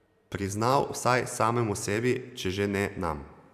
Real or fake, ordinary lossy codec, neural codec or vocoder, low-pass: fake; none; vocoder, 44.1 kHz, 128 mel bands every 512 samples, BigVGAN v2; 14.4 kHz